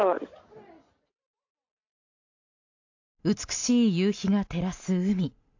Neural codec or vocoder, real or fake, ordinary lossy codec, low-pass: none; real; AAC, 48 kbps; 7.2 kHz